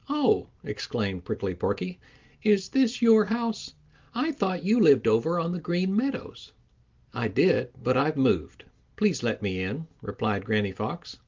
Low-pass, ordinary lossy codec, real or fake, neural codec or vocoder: 7.2 kHz; Opus, 32 kbps; real; none